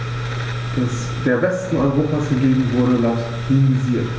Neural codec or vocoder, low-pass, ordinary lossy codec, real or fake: none; none; none; real